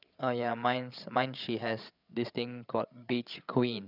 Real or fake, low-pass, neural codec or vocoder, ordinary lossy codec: fake; 5.4 kHz; codec, 16 kHz, 8 kbps, FreqCodec, larger model; none